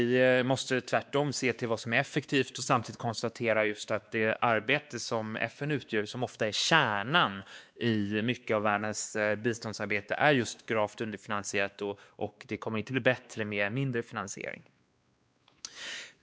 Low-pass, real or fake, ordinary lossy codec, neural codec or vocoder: none; fake; none; codec, 16 kHz, 2 kbps, X-Codec, WavLM features, trained on Multilingual LibriSpeech